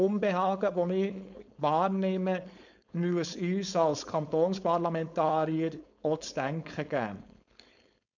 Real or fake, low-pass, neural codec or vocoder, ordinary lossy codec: fake; 7.2 kHz; codec, 16 kHz, 4.8 kbps, FACodec; none